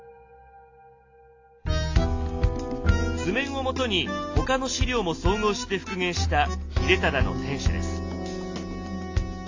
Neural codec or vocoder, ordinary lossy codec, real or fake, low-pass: none; AAC, 48 kbps; real; 7.2 kHz